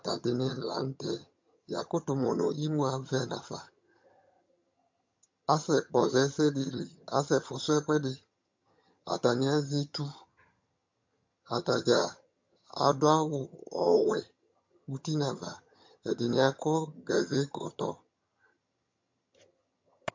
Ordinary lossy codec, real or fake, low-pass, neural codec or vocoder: MP3, 48 kbps; fake; 7.2 kHz; vocoder, 22.05 kHz, 80 mel bands, HiFi-GAN